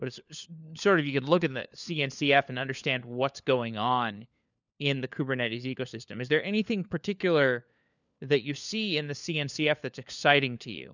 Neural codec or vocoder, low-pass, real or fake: codec, 16 kHz, 4 kbps, FunCodec, trained on LibriTTS, 50 frames a second; 7.2 kHz; fake